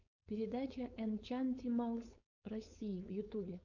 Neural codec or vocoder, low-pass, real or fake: codec, 16 kHz, 4.8 kbps, FACodec; 7.2 kHz; fake